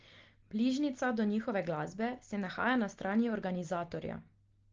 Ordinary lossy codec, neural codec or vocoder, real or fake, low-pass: Opus, 16 kbps; none; real; 7.2 kHz